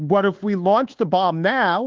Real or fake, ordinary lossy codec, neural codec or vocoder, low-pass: fake; Opus, 16 kbps; codec, 24 kHz, 1.2 kbps, DualCodec; 7.2 kHz